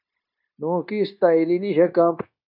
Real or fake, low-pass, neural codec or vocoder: fake; 5.4 kHz; codec, 16 kHz, 0.9 kbps, LongCat-Audio-Codec